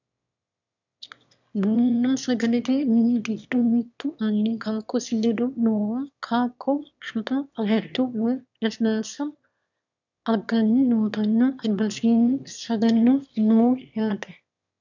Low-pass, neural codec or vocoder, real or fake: 7.2 kHz; autoencoder, 22.05 kHz, a latent of 192 numbers a frame, VITS, trained on one speaker; fake